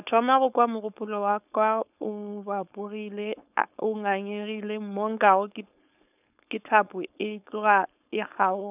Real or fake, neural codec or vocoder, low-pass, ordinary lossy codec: fake; codec, 16 kHz, 4.8 kbps, FACodec; 3.6 kHz; none